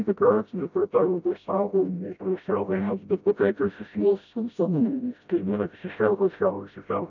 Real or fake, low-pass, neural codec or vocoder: fake; 7.2 kHz; codec, 16 kHz, 0.5 kbps, FreqCodec, smaller model